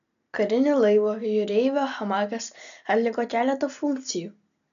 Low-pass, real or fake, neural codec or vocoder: 7.2 kHz; real; none